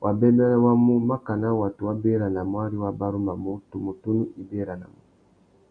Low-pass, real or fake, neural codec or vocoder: 9.9 kHz; real; none